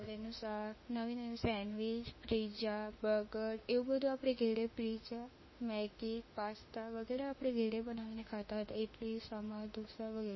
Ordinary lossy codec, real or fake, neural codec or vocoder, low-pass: MP3, 24 kbps; fake; autoencoder, 48 kHz, 32 numbers a frame, DAC-VAE, trained on Japanese speech; 7.2 kHz